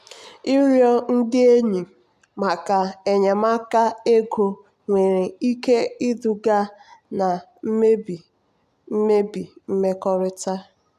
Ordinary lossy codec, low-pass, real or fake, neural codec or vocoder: none; 14.4 kHz; real; none